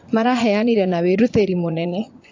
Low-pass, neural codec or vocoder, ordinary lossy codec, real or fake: 7.2 kHz; vocoder, 24 kHz, 100 mel bands, Vocos; AAC, 48 kbps; fake